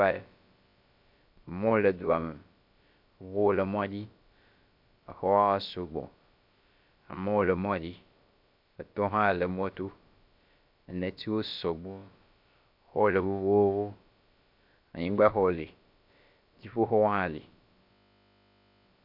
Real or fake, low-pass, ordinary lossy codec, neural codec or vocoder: fake; 5.4 kHz; MP3, 48 kbps; codec, 16 kHz, about 1 kbps, DyCAST, with the encoder's durations